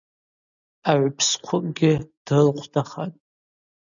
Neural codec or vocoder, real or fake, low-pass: none; real; 7.2 kHz